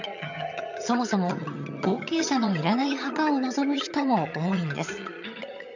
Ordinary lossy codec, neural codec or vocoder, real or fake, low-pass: none; vocoder, 22.05 kHz, 80 mel bands, HiFi-GAN; fake; 7.2 kHz